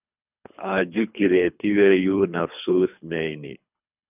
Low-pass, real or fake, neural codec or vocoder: 3.6 kHz; fake; codec, 24 kHz, 3 kbps, HILCodec